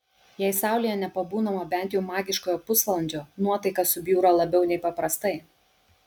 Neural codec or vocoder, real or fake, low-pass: none; real; 19.8 kHz